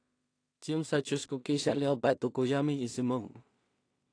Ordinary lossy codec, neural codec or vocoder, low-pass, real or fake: AAC, 48 kbps; codec, 16 kHz in and 24 kHz out, 0.4 kbps, LongCat-Audio-Codec, two codebook decoder; 9.9 kHz; fake